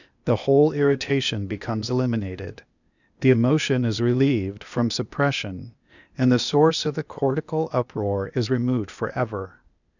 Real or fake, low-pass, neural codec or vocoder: fake; 7.2 kHz; codec, 16 kHz, 0.8 kbps, ZipCodec